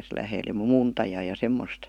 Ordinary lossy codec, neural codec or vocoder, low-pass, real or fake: none; none; 19.8 kHz; real